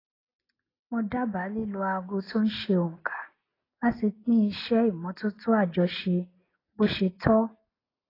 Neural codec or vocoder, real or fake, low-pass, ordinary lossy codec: none; real; 5.4 kHz; AAC, 24 kbps